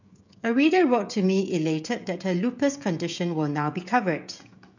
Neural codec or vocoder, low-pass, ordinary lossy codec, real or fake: codec, 16 kHz, 16 kbps, FreqCodec, smaller model; 7.2 kHz; none; fake